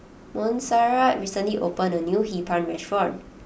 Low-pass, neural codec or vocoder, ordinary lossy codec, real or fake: none; none; none; real